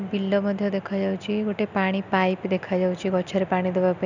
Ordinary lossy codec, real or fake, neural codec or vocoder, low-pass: none; real; none; 7.2 kHz